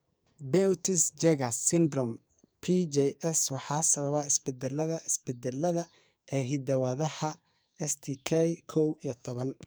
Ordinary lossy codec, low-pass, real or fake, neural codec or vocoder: none; none; fake; codec, 44.1 kHz, 2.6 kbps, SNAC